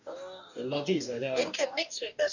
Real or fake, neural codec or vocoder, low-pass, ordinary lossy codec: fake; codec, 44.1 kHz, 2.6 kbps, DAC; 7.2 kHz; none